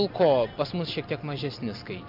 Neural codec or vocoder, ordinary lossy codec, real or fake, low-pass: none; MP3, 48 kbps; real; 5.4 kHz